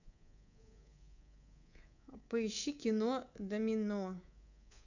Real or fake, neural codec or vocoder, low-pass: fake; codec, 24 kHz, 3.1 kbps, DualCodec; 7.2 kHz